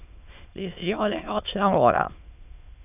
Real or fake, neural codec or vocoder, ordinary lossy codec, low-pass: fake; autoencoder, 22.05 kHz, a latent of 192 numbers a frame, VITS, trained on many speakers; none; 3.6 kHz